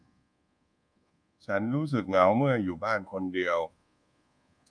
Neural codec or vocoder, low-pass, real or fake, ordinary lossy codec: codec, 24 kHz, 1.2 kbps, DualCodec; 9.9 kHz; fake; none